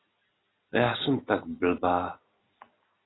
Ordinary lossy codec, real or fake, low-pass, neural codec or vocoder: AAC, 16 kbps; real; 7.2 kHz; none